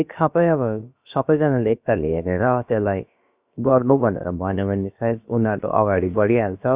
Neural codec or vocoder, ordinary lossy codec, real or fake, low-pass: codec, 16 kHz, about 1 kbps, DyCAST, with the encoder's durations; Opus, 64 kbps; fake; 3.6 kHz